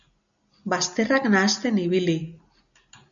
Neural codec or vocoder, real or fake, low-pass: none; real; 7.2 kHz